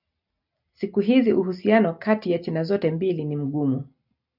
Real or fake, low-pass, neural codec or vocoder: real; 5.4 kHz; none